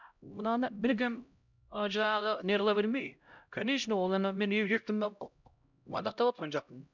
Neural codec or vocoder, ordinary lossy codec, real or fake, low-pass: codec, 16 kHz, 0.5 kbps, X-Codec, HuBERT features, trained on LibriSpeech; none; fake; 7.2 kHz